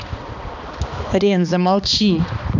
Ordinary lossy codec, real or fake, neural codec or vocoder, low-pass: none; fake; codec, 16 kHz, 2 kbps, X-Codec, HuBERT features, trained on balanced general audio; 7.2 kHz